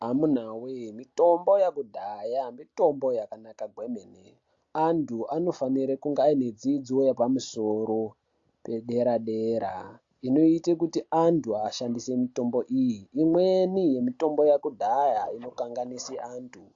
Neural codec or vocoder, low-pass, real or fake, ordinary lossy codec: none; 7.2 kHz; real; AAC, 48 kbps